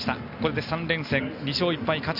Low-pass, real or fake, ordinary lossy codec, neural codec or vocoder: 5.4 kHz; real; none; none